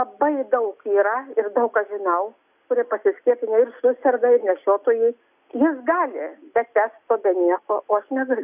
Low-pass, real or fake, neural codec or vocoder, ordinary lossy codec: 3.6 kHz; real; none; AAC, 32 kbps